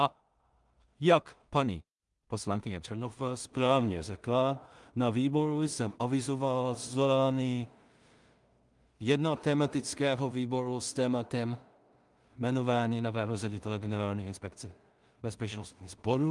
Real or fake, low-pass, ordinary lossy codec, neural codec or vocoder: fake; 10.8 kHz; Opus, 32 kbps; codec, 16 kHz in and 24 kHz out, 0.4 kbps, LongCat-Audio-Codec, two codebook decoder